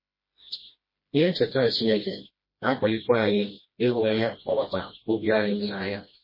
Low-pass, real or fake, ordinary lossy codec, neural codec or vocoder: 5.4 kHz; fake; MP3, 24 kbps; codec, 16 kHz, 1 kbps, FreqCodec, smaller model